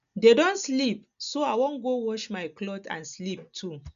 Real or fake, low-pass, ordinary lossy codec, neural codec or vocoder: real; 7.2 kHz; none; none